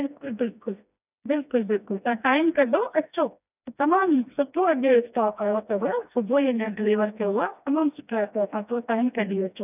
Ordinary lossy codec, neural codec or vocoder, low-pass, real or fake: none; codec, 16 kHz, 1 kbps, FreqCodec, smaller model; 3.6 kHz; fake